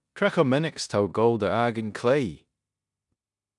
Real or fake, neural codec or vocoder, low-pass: fake; codec, 16 kHz in and 24 kHz out, 0.9 kbps, LongCat-Audio-Codec, four codebook decoder; 10.8 kHz